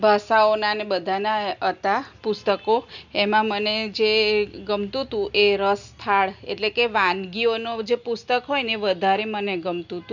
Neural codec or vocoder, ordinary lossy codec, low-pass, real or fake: none; none; 7.2 kHz; real